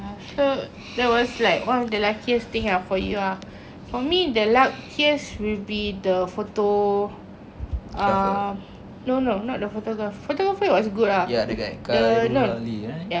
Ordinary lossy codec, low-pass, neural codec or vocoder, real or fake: none; none; none; real